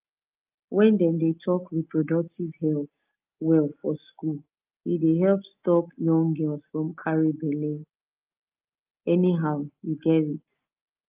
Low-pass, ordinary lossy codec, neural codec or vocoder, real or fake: 3.6 kHz; Opus, 24 kbps; none; real